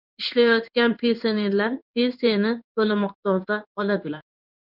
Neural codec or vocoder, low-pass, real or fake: codec, 16 kHz in and 24 kHz out, 1 kbps, XY-Tokenizer; 5.4 kHz; fake